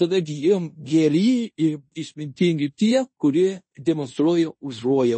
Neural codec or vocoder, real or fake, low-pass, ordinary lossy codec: codec, 16 kHz in and 24 kHz out, 0.9 kbps, LongCat-Audio-Codec, fine tuned four codebook decoder; fake; 9.9 kHz; MP3, 32 kbps